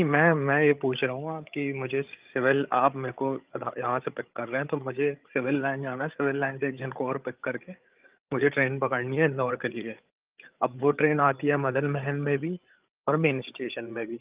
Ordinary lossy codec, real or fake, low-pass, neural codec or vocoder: Opus, 32 kbps; fake; 3.6 kHz; codec, 16 kHz, 8 kbps, FreqCodec, larger model